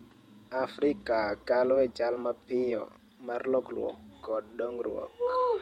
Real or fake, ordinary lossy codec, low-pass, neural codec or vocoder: fake; MP3, 64 kbps; 19.8 kHz; vocoder, 48 kHz, 128 mel bands, Vocos